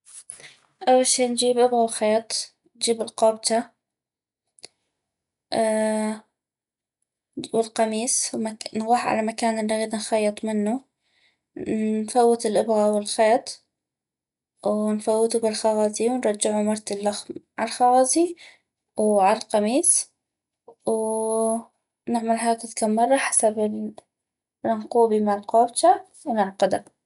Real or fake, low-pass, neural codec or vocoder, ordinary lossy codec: real; 10.8 kHz; none; none